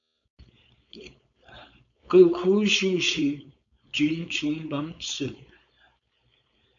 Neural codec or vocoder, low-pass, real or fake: codec, 16 kHz, 4.8 kbps, FACodec; 7.2 kHz; fake